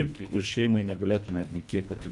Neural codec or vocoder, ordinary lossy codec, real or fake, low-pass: codec, 24 kHz, 1.5 kbps, HILCodec; MP3, 96 kbps; fake; 10.8 kHz